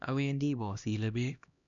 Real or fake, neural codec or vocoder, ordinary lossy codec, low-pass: fake; codec, 16 kHz, 1 kbps, X-Codec, HuBERT features, trained on LibriSpeech; none; 7.2 kHz